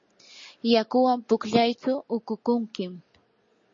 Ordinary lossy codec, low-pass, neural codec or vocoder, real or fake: MP3, 32 kbps; 7.2 kHz; none; real